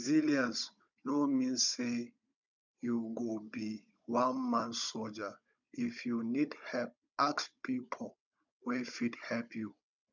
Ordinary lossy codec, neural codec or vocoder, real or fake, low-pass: none; codec, 16 kHz, 16 kbps, FunCodec, trained on Chinese and English, 50 frames a second; fake; 7.2 kHz